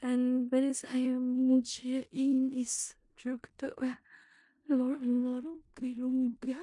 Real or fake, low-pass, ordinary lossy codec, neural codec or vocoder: fake; 10.8 kHz; MP3, 64 kbps; codec, 16 kHz in and 24 kHz out, 0.4 kbps, LongCat-Audio-Codec, four codebook decoder